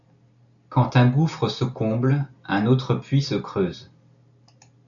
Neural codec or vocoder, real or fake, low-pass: none; real; 7.2 kHz